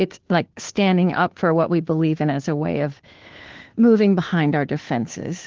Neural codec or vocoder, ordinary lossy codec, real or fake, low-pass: codec, 16 kHz, 2 kbps, FunCodec, trained on Chinese and English, 25 frames a second; Opus, 16 kbps; fake; 7.2 kHz